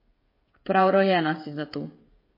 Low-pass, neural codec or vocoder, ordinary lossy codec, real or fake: 5.4 kHz; codec, 16 kHz, 16 kbps, FreqCodec, smaller model; MP3, 24 kbps; fake